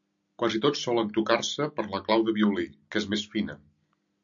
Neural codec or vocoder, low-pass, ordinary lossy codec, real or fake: none; 7.2 kHz; MP3, 64 kbps; real